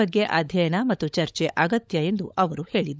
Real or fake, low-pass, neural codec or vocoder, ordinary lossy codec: fake; none; codec, 16 kHz, 16 kbps, FunCodec, trained on LibriTTS, 50 frames a second; none